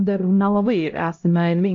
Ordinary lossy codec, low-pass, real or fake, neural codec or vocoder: AAC, 48 kbps; 7.2 kHz; fake; codec, 16 kHz, 0.5 kbps, X-Codec, HuBERT features, trained on LibriSpeech